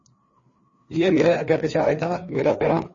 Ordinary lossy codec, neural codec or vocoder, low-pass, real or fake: MP3, 32 kbps; codec, 16 kHz, 2 kbps, FreqCodec, larger model; 7.2 kHz; fake